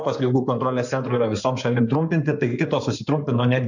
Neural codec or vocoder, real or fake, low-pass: codec, 16 kHz in and 24 kHz out, 2.2 kbps, FireRedTTS-2 codec; fake; 7.2 kHz